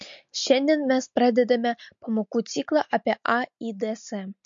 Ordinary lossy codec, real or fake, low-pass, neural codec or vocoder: MP3, 48 kbps; real; 7.2 kHz; none